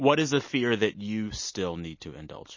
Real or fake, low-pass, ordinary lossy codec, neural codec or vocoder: real; 7.2 kHz; MP3, 32 kbps; none